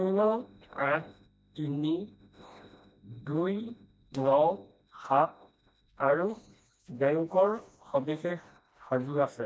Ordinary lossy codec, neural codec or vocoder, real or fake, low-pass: none; codec, 16 kHz, 1 kbps, FreqCodec, smaller model; fake; none